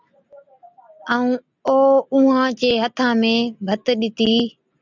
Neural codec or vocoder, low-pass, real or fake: none; 7.2 kHz; real